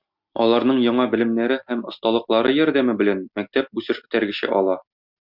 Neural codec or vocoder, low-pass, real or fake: none; 5.4 kHz; real